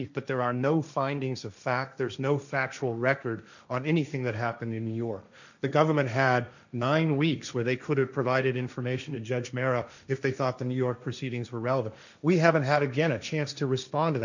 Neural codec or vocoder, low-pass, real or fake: codec, 16 kHz, 1.1 kbps, Voila-Tokenizer; 7.2 kHz; fake